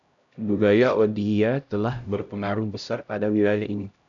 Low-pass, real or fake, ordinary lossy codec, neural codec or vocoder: 7.2 kHz; fake; MP3, 96 kbps; codec, 16 kHz, 0.5 kbps, X-Codec, HuBERT features, trained on LibriSpeech